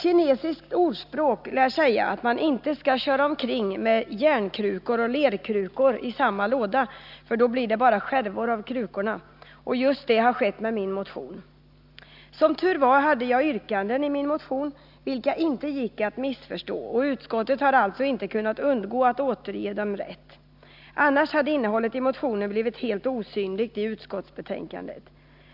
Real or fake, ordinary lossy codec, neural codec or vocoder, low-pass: real; none; none; 5.4 kHz